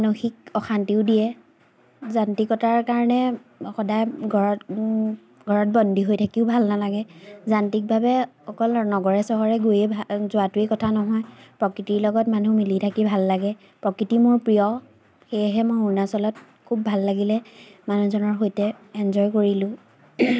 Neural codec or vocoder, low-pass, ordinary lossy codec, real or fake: none; none; none; real